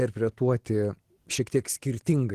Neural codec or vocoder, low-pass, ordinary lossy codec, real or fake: none; 14.4 kHz; Opus, 16 kbps; real